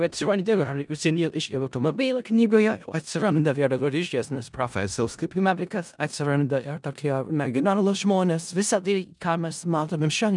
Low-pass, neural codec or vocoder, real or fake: 10.8 kHz; codec, 16 kHz in and 24 kHz out, 0.4 kbps, LongCat-Audio-Codec, four codebook decoder; fake